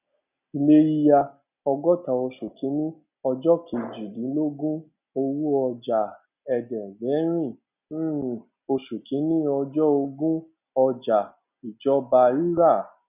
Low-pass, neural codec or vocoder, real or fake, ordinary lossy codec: 3.6 kHz; none; real; none